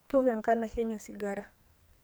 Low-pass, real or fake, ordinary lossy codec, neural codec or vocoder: none; fake; none; codec, 44.1 kHz, 2.6 kbps, SNAC